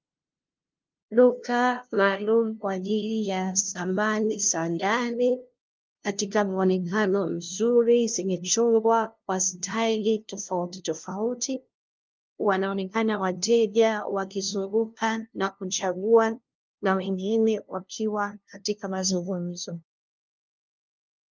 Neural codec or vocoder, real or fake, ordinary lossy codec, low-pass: codec, 16 kHz, 0.5 kbps, FunCodec, trained on LibriTTS, 25 frames a second; fake; Opus, 32 kbps; 7.2 kHz